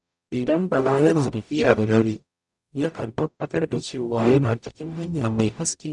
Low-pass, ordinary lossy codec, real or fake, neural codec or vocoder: 10.8 kHz; none; fake; codec, 44.1 kHz, 0.9 kbps, DAC